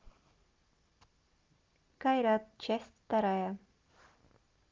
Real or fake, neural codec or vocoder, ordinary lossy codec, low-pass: real; none; Opus, 32 kbps; 7.2 kHz